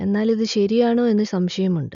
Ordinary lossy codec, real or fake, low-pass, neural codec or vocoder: none; real; 7.2 kHz; none